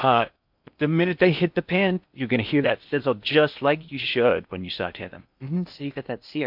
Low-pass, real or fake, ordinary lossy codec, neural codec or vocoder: 5.4 kHz; fake; MP3, 48 kbps; codec, 16 kHz in and 24 kHz out, 0.8 kbps, FocalCodec, streaming, 65536 codes